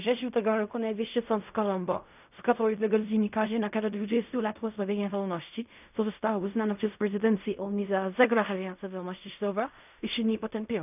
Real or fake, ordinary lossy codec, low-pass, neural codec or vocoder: fake; none; 3.6 kHz; codec, 16 kHz in and 24 kHz out, 0.4 kbps, LongCat-Audio-Codec, fine tuned four codebook decoder